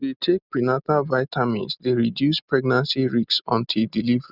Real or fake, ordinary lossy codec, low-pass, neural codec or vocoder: real; none; 5.4 kHz; none